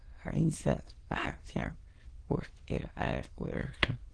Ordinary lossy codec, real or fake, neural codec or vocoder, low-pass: Opus, 16 kbps; fake; autoencoder, 22.05 kHz, a latent of 192 numbers a frame, VITS, trained on many speakers; 9.9 kHz